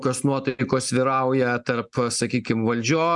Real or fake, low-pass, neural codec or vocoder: real; 10.8 kHz; none